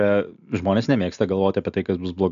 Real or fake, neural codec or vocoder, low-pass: real; none; 7.2 kHz